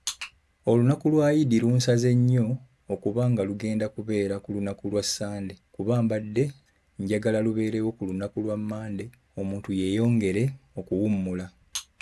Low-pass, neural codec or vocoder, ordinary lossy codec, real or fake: none; none; none; real